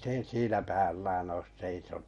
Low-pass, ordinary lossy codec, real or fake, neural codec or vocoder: 19.8 kHz; MP3, 48 kbps; real; none